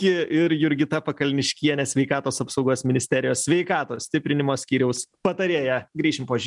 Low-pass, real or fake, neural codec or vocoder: 10.8 kHz; real; none